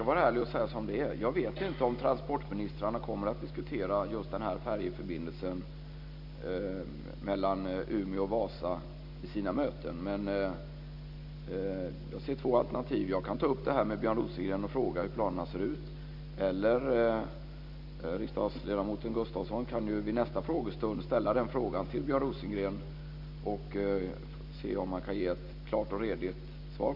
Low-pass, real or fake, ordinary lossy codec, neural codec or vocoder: 5.4 kHz; real; none; none